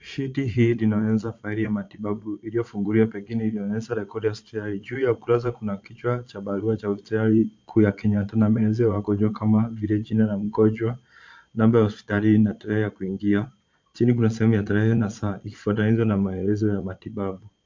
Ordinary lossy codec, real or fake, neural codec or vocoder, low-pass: MP3, 48 kbps; fake; vocoder, 22.05 kHz, 80 mel bands, Vocos; 7.2 kHz